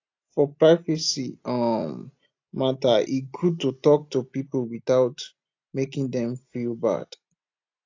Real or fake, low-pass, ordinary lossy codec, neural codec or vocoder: real; 7.2 kHz; AAC, 48 kbps; none